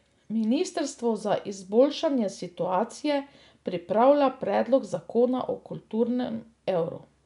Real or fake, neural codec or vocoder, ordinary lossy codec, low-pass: real; none; none; 10.8 kHz